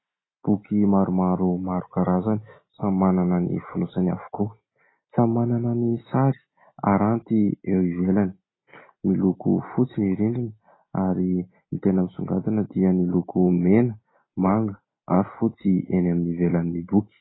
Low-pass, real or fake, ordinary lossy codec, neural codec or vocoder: 7.2 kHz; real; AAC, 16 kbps; none